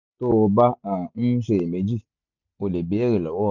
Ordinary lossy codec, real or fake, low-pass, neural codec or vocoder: none; real; 7.2 kHz; none